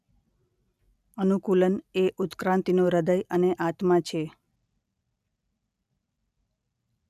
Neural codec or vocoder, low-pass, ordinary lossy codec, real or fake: none; 14.4 kHz; none; real